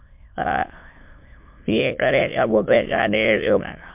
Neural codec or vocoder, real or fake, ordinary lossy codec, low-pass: autoencoder, 22.05 kHz, a latent of 192 numbers a frame, VITS, trained on many speakers; fake; MP3, 32 kbps; 3.6 kHz